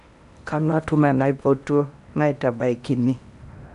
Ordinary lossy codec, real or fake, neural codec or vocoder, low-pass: none; fake; codec, 16 kHz in and 24 kHz out, 0.8 kbps, FocalCodec, streaming, 65536 codes; 10.8 kHz